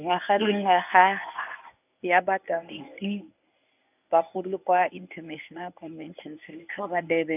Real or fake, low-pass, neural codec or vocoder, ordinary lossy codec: fake; 3.6 kHz; codec, 24 kHz, 0.9 kbps, WavTokenizer, medium speech release version 1; none